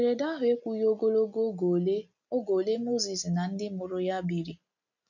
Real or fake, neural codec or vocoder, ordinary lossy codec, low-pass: real; none; none; 7.2 kHz